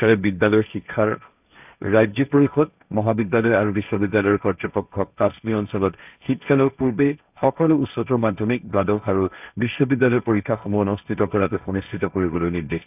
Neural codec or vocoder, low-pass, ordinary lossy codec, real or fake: codec, 16 kHz, 1.1 kbps, Voila-Tokenizer; 3.6 kHz; none; fake